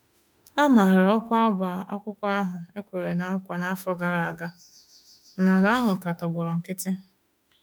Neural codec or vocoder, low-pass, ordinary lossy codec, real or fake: autoencoder, 48 kHz, 32 numbers a frame, DAC-VAE, trained on Japanese speech; none; none; fake